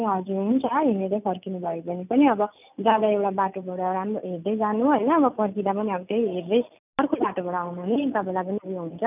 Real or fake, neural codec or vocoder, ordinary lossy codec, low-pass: real; none; none; 3.6 kHz